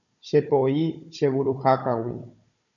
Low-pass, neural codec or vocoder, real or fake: 7.2 kHz; codec, 16 kHz, 16 kbps, FunCodec, trained on Chinese and English, 50 frames a second; fake